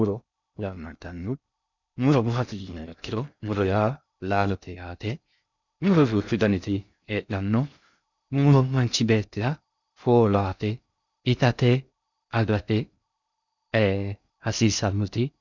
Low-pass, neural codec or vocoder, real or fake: 7.2 kHz; codec, 16 kHz in and 24 kHz out, 0.6 kbps, FocalCodec, streaming, 4096 codes; fake